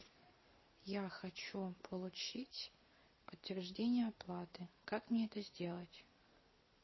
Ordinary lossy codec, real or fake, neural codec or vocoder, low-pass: MP3, 24 kbps; real; none; 7.2 kHz